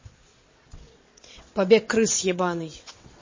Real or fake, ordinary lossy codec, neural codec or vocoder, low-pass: fake; MP3, 32 kbps; vocoder, 22.05 kHz, 80 mel bands, WaveNeXt; 7.2 kHz